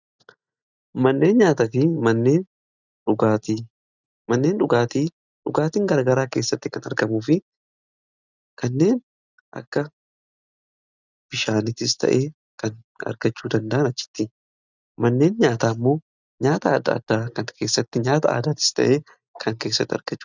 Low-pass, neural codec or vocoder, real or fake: 7.2 kHz; none; real